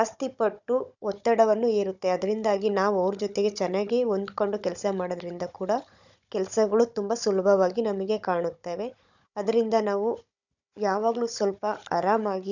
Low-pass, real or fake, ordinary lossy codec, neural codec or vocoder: 7.2 kHz; fake; none; codec, 16 kHz, 16 kbps, FunCodec, trained on Chinese and English, 50 frames a second